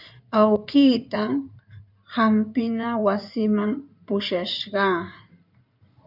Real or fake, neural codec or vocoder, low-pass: fake; codec, 16 kHz in and 24 kHz out, 2.2 kbps, FireRedTTS-2 codec; 5.4 kHz